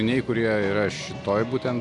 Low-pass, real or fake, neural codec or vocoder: 10.8 kHz; real; none